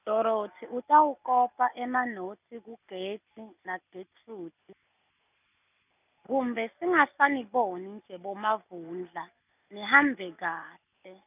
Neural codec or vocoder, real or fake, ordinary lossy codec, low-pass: none; real; none; 3.6 kHz